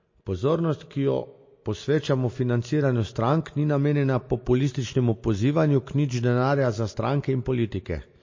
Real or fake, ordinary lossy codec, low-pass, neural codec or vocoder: real; MP3, 32 kbps; 7.2 kHz; none